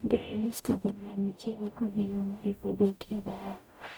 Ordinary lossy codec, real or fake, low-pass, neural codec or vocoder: none; fake; none; codec, 44.1 kHz, 0.9 kbps, DAC